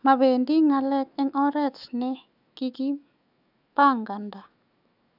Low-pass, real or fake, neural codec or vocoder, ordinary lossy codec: 5.4 kHz; fake; autoencoder, 48 kHz, 128 numbers a frame, DAC-VAE, trained on Japanese speech; none